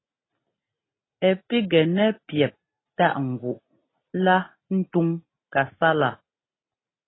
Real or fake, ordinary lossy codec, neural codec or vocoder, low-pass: real; AAC, 16 kbps; none; 7.2 kHz